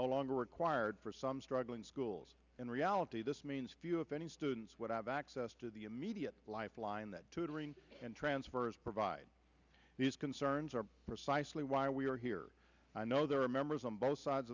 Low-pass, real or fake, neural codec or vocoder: 7.2 kHz; real; none